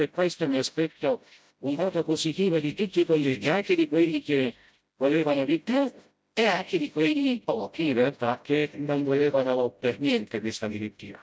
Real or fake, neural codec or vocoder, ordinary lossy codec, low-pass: fake; codec, 16 kHz, 0.5 kbps, FreqCodec, smaller model; none; none